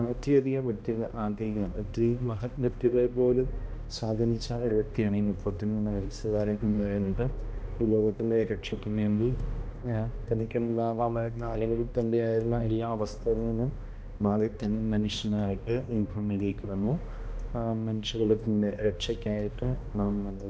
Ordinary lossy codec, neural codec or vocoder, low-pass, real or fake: none; codec, 16 kHz, 1 kbps, X-Codec, HuBERT features, trained on balanced general audio; none; fake